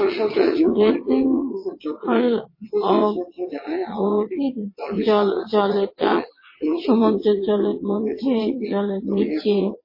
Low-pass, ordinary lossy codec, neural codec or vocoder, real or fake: 5.4 kHz; MP3, 24 kbps; vocoder, 22.05 kHz, 80 mel bands, WaveNeXt; fake